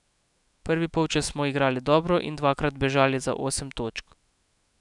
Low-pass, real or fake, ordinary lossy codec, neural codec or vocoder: 10.8 kHz; fake; none; autoencoder, 48 kHz, 128 numbers a frame, DAC-VAE, trained on Japanese speech